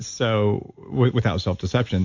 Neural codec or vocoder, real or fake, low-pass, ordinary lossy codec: none; real; 7.2 kHz; MP3, 64 kbps